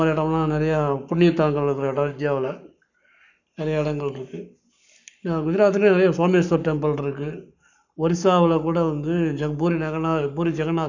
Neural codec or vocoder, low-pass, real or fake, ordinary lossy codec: codec, 44.1 kHz, 7.8 kbps, Pupu-Codec; 7.2 kHz; fake; none